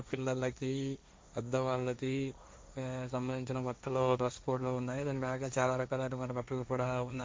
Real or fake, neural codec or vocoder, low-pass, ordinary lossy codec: fake; codec, 16 kHz, 1.1 kbps, Voila-Tokenizer; none; none